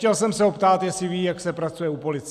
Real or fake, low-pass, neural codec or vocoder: real; 14.4 kHz; none